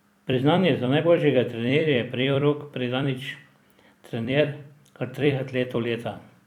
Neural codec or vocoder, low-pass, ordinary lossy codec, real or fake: vocoder, 44.1 kHz, 128 mel bands every 256 samples, BigVGAN v2; 19.8 kHz; none; fake